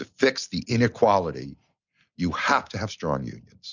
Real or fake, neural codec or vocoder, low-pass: real; none; 7.2 kHz